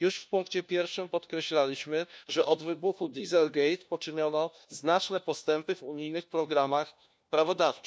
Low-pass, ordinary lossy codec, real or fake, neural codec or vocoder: none; none; fake; codec, 16 kHz, 1 kbps, FunCodec, trained on LibriTTS, 50 frames a second